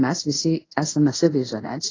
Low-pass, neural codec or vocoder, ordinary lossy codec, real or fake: 7.2 kHz; codec, 24 kHz, 0.5 kbps, DualCodec; AAC, 32 kbps; fake